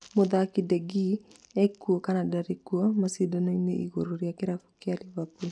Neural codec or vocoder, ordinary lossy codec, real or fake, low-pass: none; none; real; 9.9 kHz